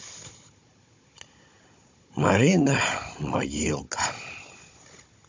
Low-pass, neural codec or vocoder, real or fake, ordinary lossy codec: 7.2 kHz; codec, 16 kHz, 16 kbps, FunCodec, trained on Chinese and English, 50 frames a second; fake; MP3, 48 kbps